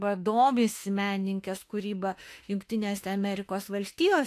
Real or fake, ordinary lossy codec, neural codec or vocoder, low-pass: fake; AAC, 64 kbps; autoencoder, 48 kHz, 32 numbers a frame, DAC-VAE, trained on Japanese speech; 14.4 kHz